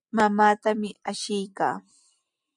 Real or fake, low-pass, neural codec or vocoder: real; 10.8 kHz; none